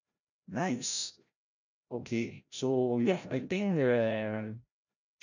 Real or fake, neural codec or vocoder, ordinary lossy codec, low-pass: fake; codec, 16 kHz, 0.5 kbps, FreqCodec, larger model; none; 7.2 kHz